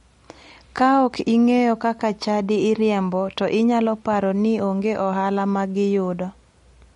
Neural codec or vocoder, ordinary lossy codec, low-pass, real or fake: none; MP3, 48 kbps; 10.8 kHz; real